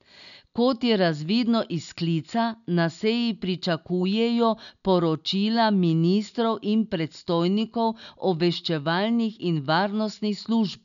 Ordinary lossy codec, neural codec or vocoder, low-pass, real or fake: none; none; 7.2 kHz; real